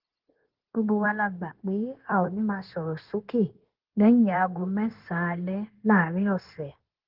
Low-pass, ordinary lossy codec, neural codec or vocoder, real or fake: 5.4 kHz; Opus, 16 kbps; codec, 16 kHz, 0.9 kbps, LongCat-Audio-Codec; fake